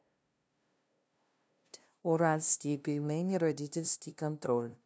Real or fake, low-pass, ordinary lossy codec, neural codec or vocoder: fake; none; none; codec, 16 kHz, 0.5 kbps, FunCodec, trained on LibriTTS, 25 frames a second